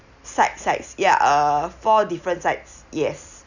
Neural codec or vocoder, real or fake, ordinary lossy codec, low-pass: none; real; none; 7.2 kHz